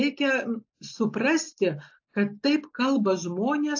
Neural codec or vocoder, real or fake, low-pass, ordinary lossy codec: none; real; 7.2 kHz; AAC, 48 kbps